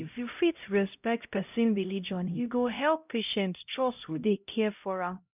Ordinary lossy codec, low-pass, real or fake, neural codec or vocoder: none; 3.6 kHz; fake; codec, 16 kHz, 0.5 kbps, X-Codec, HuBERT features, trained on LibriSpeech